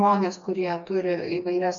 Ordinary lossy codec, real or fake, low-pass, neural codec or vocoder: MP3, 64 kbps; fake; 7.2 kHz; codec, 16 kHz, 2 kbps, FreqCodec, smaller model